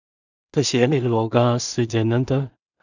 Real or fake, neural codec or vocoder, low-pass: fake; codec, 16 kHz in and 24 kHz out, 0.4 kbps, LongCat-Audio-Codec, two codebook decoder; 7.2 kHz